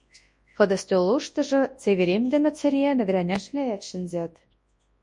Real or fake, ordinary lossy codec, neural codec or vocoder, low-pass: fake; MP3, 48 kbps; codec, 24 kHz, 0.9 kbps, WavTokenizer, large speech release; 10.8 kHz